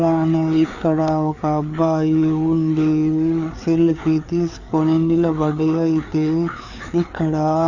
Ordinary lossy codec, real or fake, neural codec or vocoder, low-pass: none; fake; codec, 16 kHz, 4 kbps, FreqCodec, larger model; 7.2 kHz